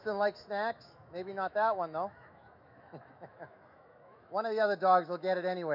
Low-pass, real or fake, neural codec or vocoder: 5.4 kHz; fake; autoencoder, 48 kHz, 128 numbers a frame, DAC-VAE, trained on Japanese speech